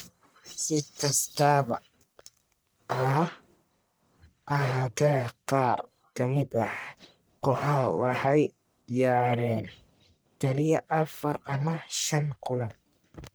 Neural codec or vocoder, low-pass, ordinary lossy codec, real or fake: codec, 44.1 kHz, 1.7 kbps, Pupu-Codec; none; none; fake